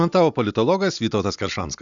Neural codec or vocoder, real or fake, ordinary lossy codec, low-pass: none; real; MP3, 96 kbps; 7.2 kHz